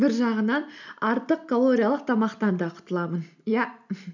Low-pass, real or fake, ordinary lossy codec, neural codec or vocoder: 7.2 kHz; real; none; none